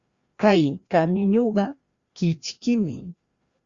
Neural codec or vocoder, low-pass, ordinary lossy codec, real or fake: codec, 16 kHz, 1 kbps, FreqCodec, larger model; 7.2 kHz; Opus, 64 kbps; fake